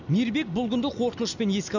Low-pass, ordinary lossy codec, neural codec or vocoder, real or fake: 7.2 kHz; none; none; real